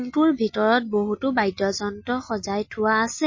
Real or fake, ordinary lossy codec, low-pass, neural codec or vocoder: real; MP3, 32 kbps; 7.2 kHz; none